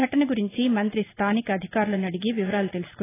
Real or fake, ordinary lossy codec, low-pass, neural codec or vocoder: real; AAC, 16 kbps; 3.6 kHz; none